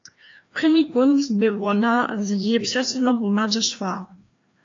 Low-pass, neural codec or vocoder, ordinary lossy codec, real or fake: 7.2 kHz; codec, 16 kHz, 1 kbps, FreqCodec, larger model; AAC, 48 kbps; fake